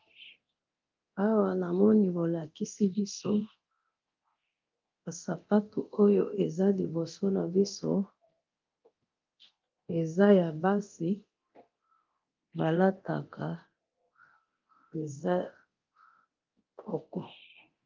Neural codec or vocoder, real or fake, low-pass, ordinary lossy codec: codec, 24 kHz, 0.9 kbps, DualCodec; fake; 7.2 kHz; Opus, 24 kbps